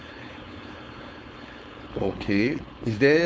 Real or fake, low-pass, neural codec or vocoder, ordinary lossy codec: fake; none; codec, 16 kHz, 4.8 kbps, FACodec; none